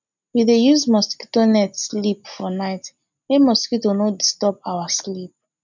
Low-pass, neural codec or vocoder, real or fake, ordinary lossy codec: 7.2 kHz; none; real; none